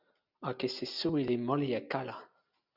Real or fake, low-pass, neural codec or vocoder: real; 5.4 kHz; none